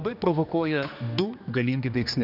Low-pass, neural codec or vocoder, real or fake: 5.4 kHz; codec, 16 kHz, 2 kbps, X-Codec, HuBERT features, trained on general audio; fake